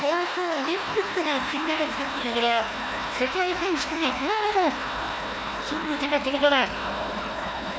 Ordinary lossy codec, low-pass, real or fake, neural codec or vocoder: none; none; fake; codec, 16 kHz, 1 kbps, FunCodec, trained on Chinese and English, 50 frames a second